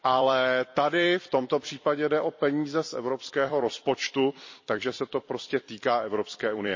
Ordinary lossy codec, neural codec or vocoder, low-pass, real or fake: MP3, 32 kbps; vocoder, 44.1 kHz, 128 mel bands every 256 samples, BigVGAN v2; 7.2 kHz; fake